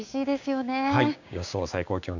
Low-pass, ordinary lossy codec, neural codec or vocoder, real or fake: 7.2 kHz; none; codec, 16 kHz, 6 kbps, DAC; fake